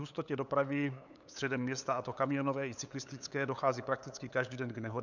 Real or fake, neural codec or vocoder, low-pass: fake; codec, 16 kHz, 8 kbps, FunCodec, trained on LibriTTS, 25 frames a second; 7.2 kHz